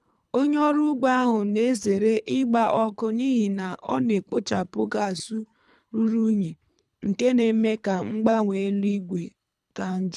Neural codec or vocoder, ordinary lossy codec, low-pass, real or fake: codec, 24 kHz, 3 kbps, HILCodec; none; none; fake